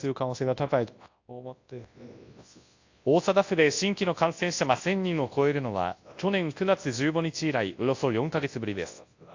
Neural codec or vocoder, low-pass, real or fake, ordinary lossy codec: codec, 24 kHz, 0.9 kbps, WavTokenizer, large speech release; 7.2 kHz; fake; AAC, 48 kbps